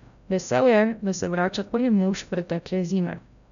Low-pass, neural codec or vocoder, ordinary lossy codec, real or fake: 7.2 kHz; codec, 16 kHz, 0.5 kbps, FreqCodec, larger model; none; fake